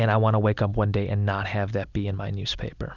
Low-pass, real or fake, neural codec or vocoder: 7.2 kHz; real; none